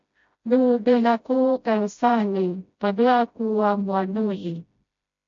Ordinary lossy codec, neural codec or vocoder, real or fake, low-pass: MP3, 48 kbps; codec, 16 kHz, 0.5 kbps, FreqCodec, smaller model; fake; 7.2 kHz